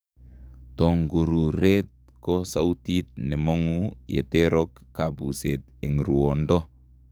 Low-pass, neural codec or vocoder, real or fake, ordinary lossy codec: none; codec, 44.1 kHz, 7.8 kbps, DAC; fake; none